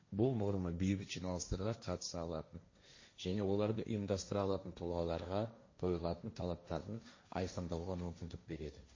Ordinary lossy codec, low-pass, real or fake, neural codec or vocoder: MP3, 32 kbps; 7.2 kHz; fake; codec, 16 kHz, 1.1 kbps, Voila-Tokenizer